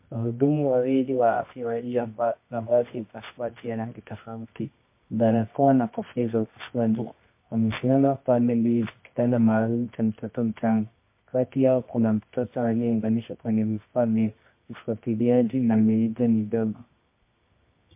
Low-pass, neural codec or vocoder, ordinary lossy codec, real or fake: 3.6 kHz; codec, 24 kHz, 0.9 kbps, WavTokenizer, medium music audio release; MP3, 24 kbps; fake